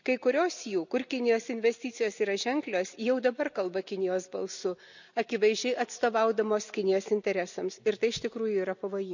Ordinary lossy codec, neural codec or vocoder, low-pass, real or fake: none; none; 7.2 kHz; real